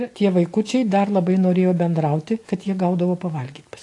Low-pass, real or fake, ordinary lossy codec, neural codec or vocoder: 10.8 kHz; real; AAC, 48 kbps; none